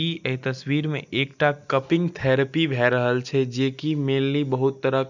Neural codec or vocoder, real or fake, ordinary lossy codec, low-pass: none; real; none; 7.2 kHz